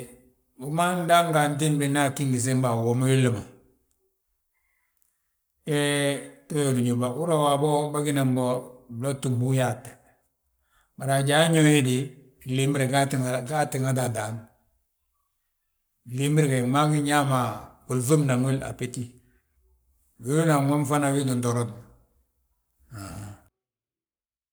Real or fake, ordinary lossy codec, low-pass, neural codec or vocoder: fake; none; none; codec, 44.1 kHz, 7.8 kbps, Pupu-Codec